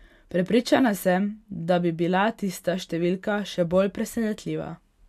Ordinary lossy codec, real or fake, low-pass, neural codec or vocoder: none; real; 14.4 kHz; none